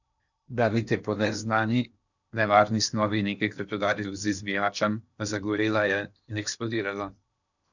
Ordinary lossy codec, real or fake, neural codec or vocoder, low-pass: none; fake; codec, 16 kHz in and 24 kHz out, 0.8 kbps, FocalCodec, streaming, 65536 codes; 7.2 kHz